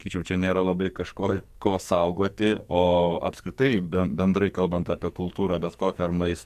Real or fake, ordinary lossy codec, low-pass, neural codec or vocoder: fake; AAC, 96 kbps; 14.4 kHz; codec, 44.1 kHz, 2.6 kbps, SNAC